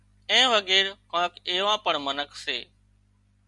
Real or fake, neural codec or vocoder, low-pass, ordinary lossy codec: real; none; 10.8 kHz; Opus, 64 kbps